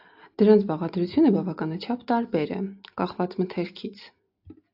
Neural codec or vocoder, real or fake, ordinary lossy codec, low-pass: none; real; AAC, 48 kbps; 5.4 kHz